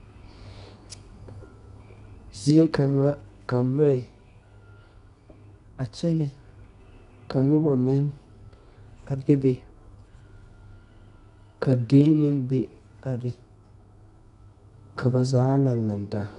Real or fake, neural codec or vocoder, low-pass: fake; codec, 24 kHz, 0.9 kbps, WavTokenizer, medium music audio release; 10.8 kHz